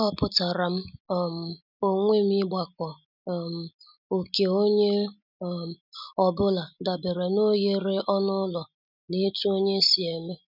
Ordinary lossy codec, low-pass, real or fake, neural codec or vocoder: none; 5.4 kHz; real; none